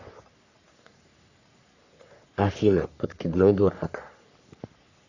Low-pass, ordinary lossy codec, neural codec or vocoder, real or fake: 7.2 kHz; none; codec, 44.1 kHz, 3.4 kbps, Pupu-Codec; fake